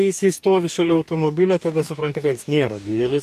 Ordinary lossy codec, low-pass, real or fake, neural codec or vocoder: MP3, 96 kbps; 14.4 kHz; fake; codec, 44.1 kHz, 2.6 kbps, DAC